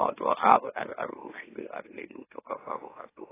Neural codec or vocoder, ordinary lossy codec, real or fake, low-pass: autoencoder, 44.1 kHz, a latent of 192 numbers a frame, MeloTTS; AAC, 16 kbps; fake; 3.6 kHz